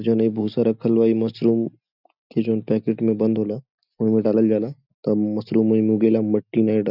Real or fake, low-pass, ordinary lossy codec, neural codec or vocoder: real; 5.4 kHz; none; none